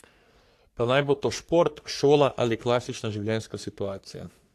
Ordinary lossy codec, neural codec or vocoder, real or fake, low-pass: AAC, 64 kbps; codec, 44.1 kHz, 3.4 kbps, Pupu-Codec; fake; 14.4 kHz